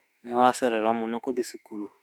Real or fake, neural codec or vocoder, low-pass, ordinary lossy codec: fake; autoencoder, 48 kHz, 32 numbers a frame, DAC-VAE, trained on Japanese speech; 19.8 kHz; none